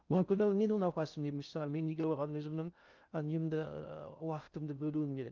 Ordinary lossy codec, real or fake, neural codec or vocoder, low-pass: Opus, 24 kbps; fake; codec, 16 kHz in and 24 kHz out, 0.6 kbps, FocalCodec, streaming, 2048 codes; 7.2 kHz